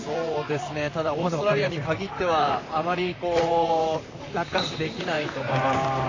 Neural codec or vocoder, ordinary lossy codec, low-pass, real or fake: vocoder, 44.1 kHz, 128 mel bands, Pupu-Vocoder; AAC, 32 kbps; 7.2 kHz; fake